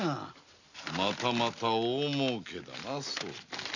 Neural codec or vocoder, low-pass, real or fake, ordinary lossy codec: none; 7.2 kHz; real; none